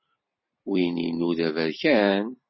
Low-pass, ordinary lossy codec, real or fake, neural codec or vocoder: 7.2 kHz; MP3, 24 kbps; fake; vocoder, 44.1 kHz, 128 mel bands every 512 samples, BigVGAN v2